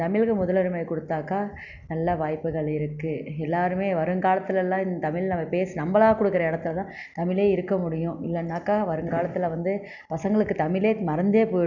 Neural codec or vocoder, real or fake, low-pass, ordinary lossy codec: none; real; 7.2 kHz; none